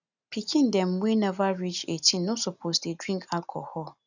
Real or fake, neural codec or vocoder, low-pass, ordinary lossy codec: real; none; 7.2 kHz; none